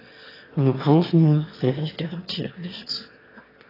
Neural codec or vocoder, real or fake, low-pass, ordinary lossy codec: autoencoder, 22.05 kHz, a latent of 192 numbers a frame, VITS, trained on one speaker; fake; 5.4 kHz; AAC, 24 kbps